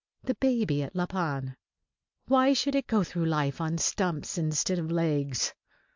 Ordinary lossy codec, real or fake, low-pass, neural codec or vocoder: MP3, 64 kbps; real; 7.2 kHz; none